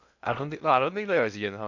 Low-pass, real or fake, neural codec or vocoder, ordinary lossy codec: 7.2 kHz; fake; codec, 16 kHz in and 24 kHz out, 0.8 kbps, FocalCodec, streaming, 65536 codes; none